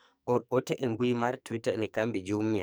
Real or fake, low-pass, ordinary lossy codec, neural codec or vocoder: fake; none; none; codec, 44.1 kHz, 2.6 kbps, SNAC